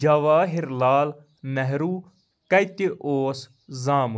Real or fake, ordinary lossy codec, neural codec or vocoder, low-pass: real; none; none; none